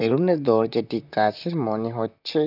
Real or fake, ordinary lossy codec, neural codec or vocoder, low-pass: fake; none; vocoder, 44.1 kHz, 128 mel bands, Pupu-Vocoder; 5.4 kHz